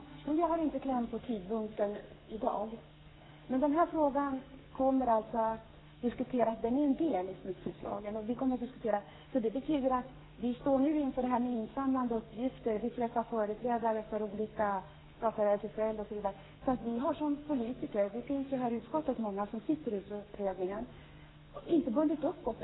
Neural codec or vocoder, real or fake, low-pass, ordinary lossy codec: codec, 44.1 kHz, 2.6 kbps, SNAC; fake; 7.2 kHz; AAC, 16 kbps